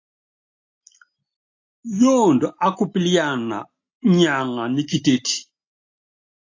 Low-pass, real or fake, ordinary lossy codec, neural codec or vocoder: 7.2 kHz; real; AAC, 48 kbps; none